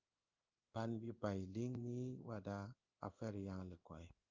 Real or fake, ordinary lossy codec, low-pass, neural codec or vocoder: fake; Opus, 24 kbps; 7.2 kHz; codec, 16 kHz in and 24 kHz out, 1 kbps, XY-Tokenizer